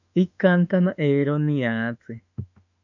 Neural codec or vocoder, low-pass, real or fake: autoencoder, 48 kHz, 32 numbers a frame, DAC-VAE, trained on Japanese speech; 7.2 kHz; fake